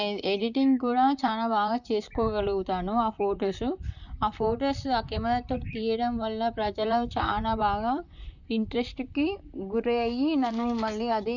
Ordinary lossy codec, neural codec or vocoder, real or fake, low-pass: none; vocoder, 44.1 kHz, 128 mel bands, Pupu-Vocoder; fake; 7.2 kHz